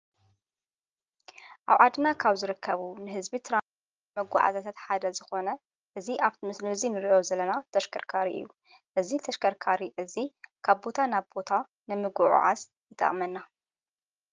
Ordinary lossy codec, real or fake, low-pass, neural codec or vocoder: Opus, 24 kbps; real; 7.2 kHz; none